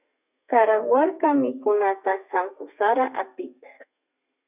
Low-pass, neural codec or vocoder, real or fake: 3.6 kHz; codec, 32 kHz, 1.9 kbps, SNAC; fake